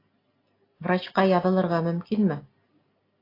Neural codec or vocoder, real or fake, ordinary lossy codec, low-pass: none; real; AAC, 24 kbps; 5.4 kHz